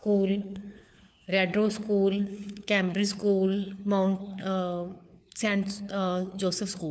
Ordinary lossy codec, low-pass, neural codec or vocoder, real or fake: none; none; codec, 16 kHz, 4 kbps, FunCodec, trained on LibriTTS, 50 frames a second; fake